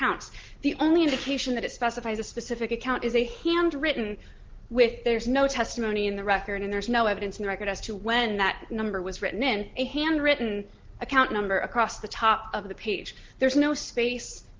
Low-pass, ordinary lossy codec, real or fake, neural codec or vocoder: 7.2 kHz; Opus, 16 kbps; real; none